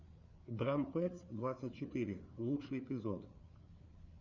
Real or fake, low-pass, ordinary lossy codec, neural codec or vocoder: fake; 7.2 kHz; Opus, 64 kbps; codec, 16 kHz, 4 kbps, FreqCodec, larger model